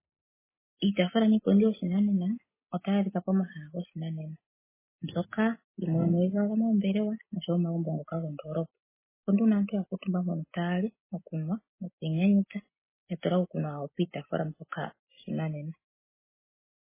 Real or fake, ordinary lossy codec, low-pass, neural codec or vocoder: real; MP3, 16 kbps; 3.6 kHz; none